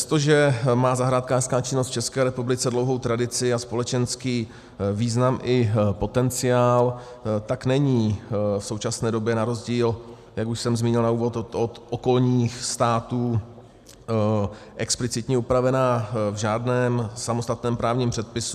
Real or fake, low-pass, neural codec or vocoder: real; 14.4 kHz; none